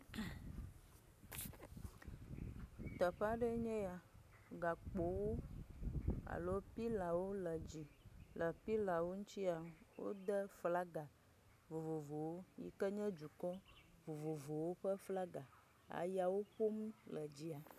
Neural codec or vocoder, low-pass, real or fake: none; 14.4 kHz; real